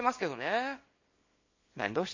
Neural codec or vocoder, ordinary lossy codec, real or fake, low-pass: codec, 24 kHz, 0.9 kbps, WavTokenizer, small release; MP3, 32 kbps; fake; 7.2 kHz